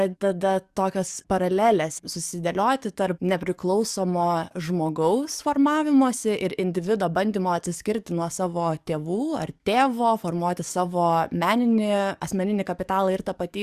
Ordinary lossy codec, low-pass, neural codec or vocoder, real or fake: Opus, 64 kbps; 14.4 kHz; codec, 44.1 kHz, 7.8 kbps, DAC; fake